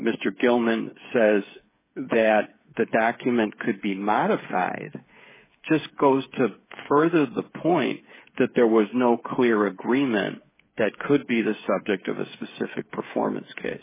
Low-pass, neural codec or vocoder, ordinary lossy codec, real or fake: 3.6 kHz; codec, 16 kHz, 8 kbps, FreqCodec, smaller model; MP3, 16 kbps; fake